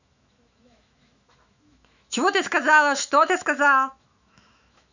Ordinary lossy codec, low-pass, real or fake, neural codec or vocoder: none; 7.2 kHz; fake; autoencoder, 48 kHz, 128 numbers a frame, DAC-VAE, trained on Japanese speech